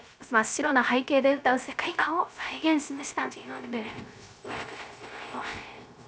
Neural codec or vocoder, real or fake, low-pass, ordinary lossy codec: codec, 16 kHz, 0.3 kbps, FocalCodec; fake; none; none